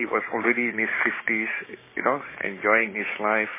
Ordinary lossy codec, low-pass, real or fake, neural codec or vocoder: MP3, 16 kbps; 3.6 kHz; fake; codec, 16 kHz, 6 kbps, DAC